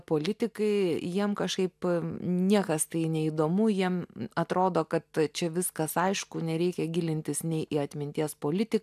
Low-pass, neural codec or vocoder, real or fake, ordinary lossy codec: 14.4 kHz; none; real; AAC, 96 kbps